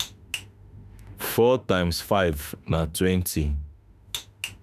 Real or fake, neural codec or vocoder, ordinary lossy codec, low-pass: fake; autoencoder, 48 kHz, 32 numbers a frame, DAC-VAE, trained on Japanese speech; none; 14.4 kHz